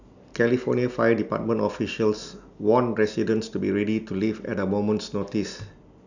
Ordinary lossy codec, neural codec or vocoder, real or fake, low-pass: none; none; real; 7.2 kHz